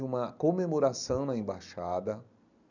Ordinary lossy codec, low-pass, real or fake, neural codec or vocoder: none; 7.2 kHz; real; none